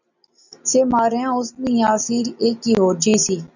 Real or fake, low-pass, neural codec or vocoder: real; 7.2 kHz; none